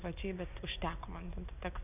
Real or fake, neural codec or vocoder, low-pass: real; none; 3.6 kHz